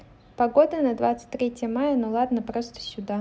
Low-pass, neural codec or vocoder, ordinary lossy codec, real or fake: none; none; none; real